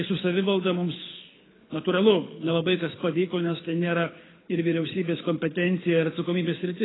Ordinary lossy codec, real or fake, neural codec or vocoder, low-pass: AAC, 16 kbps; fake; codec, 24 kHz, 6 kbps, HILCodec; 7.2 kHz